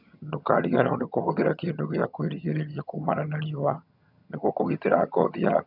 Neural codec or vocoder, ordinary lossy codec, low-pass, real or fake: vocoder, 22.05 kHz, 80 mel bands, HiFi-GAN; none; 5.4 kHz; fake